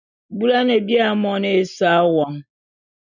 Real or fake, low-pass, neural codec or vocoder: real; 7.2 kHz; none